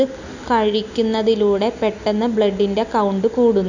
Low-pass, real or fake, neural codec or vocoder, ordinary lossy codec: 7.2 kHz; real; none; none